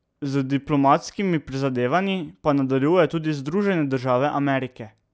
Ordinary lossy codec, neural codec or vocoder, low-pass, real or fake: none; none; none; real